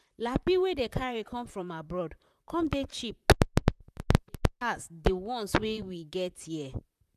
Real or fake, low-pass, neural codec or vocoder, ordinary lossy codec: fake; 14.4 kHz; vocoder, 44.1 kHz, 128 mel bands, Pupu-Vocoder; none